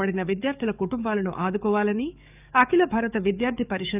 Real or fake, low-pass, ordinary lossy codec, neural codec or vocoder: fake; 3.6 kHz; none; codec, 44.1 kHz, 7.8 kbps, DAC